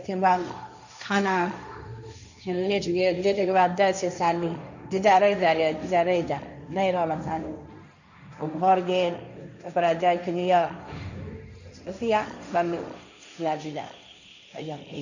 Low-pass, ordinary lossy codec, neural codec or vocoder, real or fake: 7.2 kHz; none; codec, 16 kHz, 1.1 kbps, Voila-Tokenizer; fake